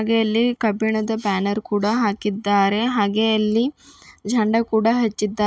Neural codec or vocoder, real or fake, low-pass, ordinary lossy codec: none; real; none; none